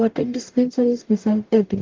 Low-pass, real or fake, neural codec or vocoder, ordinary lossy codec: 7.2 kHz; fake; codec, 44.1 kHz, 0.9 kbps, DAC; Opus, 32 kbps